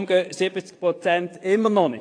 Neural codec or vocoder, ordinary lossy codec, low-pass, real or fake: vocoder, 22.05 kHz, 80 mel bands, WaveNeXt; AAC, 48 kbps; 9.9 kHz; fake